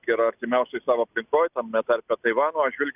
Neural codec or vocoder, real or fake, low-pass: none; real; 3.6 kHz